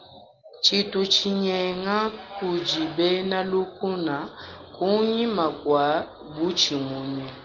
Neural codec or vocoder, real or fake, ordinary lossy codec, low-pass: none; real; Opus, 32 kbps; 7.2 kHz